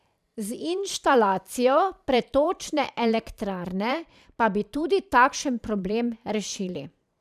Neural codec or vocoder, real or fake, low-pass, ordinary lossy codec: vocoder, 44.1 kHz, 128 mel bands every 512 samples, BigVGAN v2; fake; 14.4 kHz; none